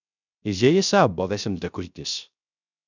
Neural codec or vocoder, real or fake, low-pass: codec, 16 kHz, 0.3 kbps, FocalCodec; fake; 7.2 kHz